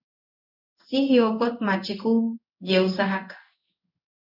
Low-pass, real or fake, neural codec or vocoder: 5.4 kHz; fake; codec, 16 kHz in and 24 kHz out, 1 kbps, XY-Tokenizer